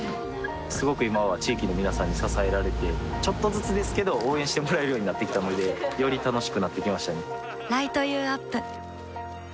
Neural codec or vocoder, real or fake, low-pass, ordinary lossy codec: none; real; none; none